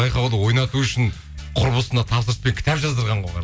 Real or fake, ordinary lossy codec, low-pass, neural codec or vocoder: real; none; none; none